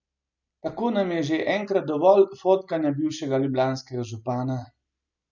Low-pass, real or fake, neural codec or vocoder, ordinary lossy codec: 7.2 kHz; real; none; none